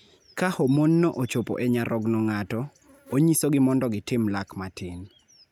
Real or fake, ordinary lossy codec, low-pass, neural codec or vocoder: real; none; 19.8 kHz; none